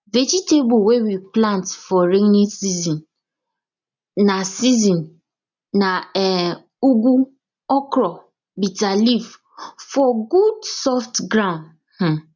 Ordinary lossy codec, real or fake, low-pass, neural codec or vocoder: none; real; 7.2 kHz; none